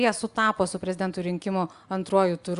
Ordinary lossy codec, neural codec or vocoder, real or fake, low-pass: Opus, 64 kbps; none; real; 10.8 kHz